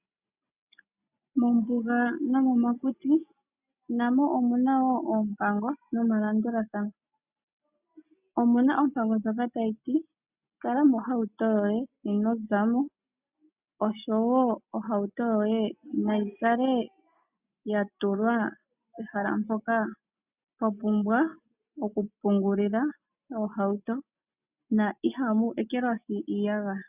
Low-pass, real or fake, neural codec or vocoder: 3.6 kHz; real; none